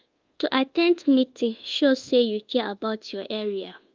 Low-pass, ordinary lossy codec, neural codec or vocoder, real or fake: 7.2 kHz; Opus, 32 kbps; codec, 24 kHz, 1.2 kbps, DualCodec; fake